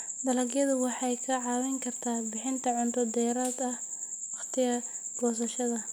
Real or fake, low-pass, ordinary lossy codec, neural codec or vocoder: real; none; none; none